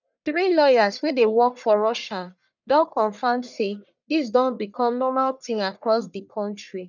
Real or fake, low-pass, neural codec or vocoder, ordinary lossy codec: fake; 7.2 kHz; codec, 44.1 kHz, 1.7 kbps, Pupu-Codec; none